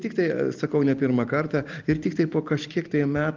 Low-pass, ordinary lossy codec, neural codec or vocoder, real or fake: 7.2 kHz; Opus, 32 kbps; none; real